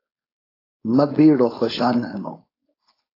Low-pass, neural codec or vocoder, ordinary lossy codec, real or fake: 5.4 kHz; codec, 16 kHz, 4.8 kbps, FACodec; AAC, 24 kbps; fake